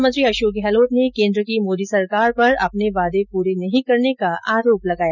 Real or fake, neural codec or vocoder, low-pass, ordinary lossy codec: real; none; 7.2 kHz; none